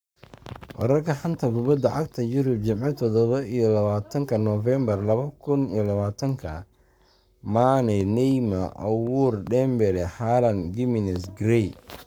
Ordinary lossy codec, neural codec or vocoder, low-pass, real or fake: none; codec, 44.1 kHz, 7.8 kbps, Pupu-Codec; none; fake